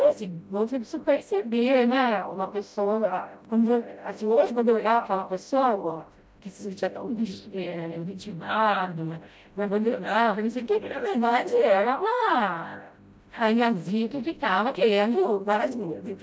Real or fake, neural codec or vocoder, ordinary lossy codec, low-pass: fake; codec, 16 kHz, 0.5 kbps, FreqCodec, smaller model; none; none